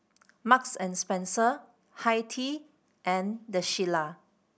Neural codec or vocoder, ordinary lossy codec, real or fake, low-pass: none; none; real; none